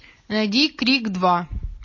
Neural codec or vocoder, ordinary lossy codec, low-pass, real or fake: none; MP3, 32 kbps; 7.2 kHz; real